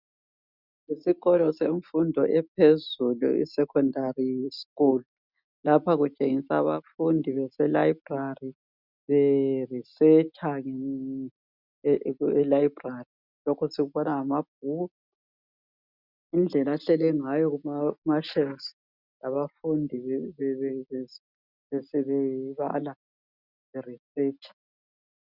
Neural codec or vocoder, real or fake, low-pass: none; real; 5.4 kHz